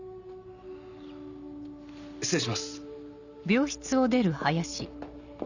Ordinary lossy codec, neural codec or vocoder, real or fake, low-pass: none; none; real; 7.2 kHz